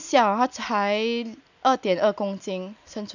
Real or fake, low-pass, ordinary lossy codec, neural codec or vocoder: real; 7.2 kHz; none; none